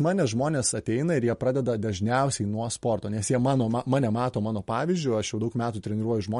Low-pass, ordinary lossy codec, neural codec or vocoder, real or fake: 19.8 kHz; MP3, 48 kbps; none; real